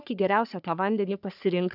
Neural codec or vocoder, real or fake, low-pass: codec, 44.1 kHz, 3.4 kbps, Pupu-Codec; fake; 5.4 kHz